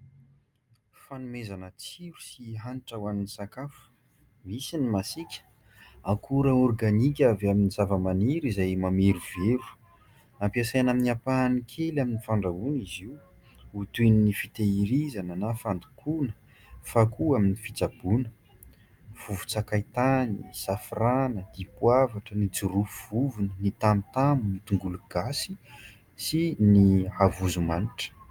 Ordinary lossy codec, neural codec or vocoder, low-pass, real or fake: Opus, 32 kbps; none; 19.8 kHz; real